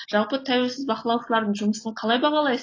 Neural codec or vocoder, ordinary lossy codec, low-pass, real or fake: none; AAC, 32 kbps; 7.2 kHz; real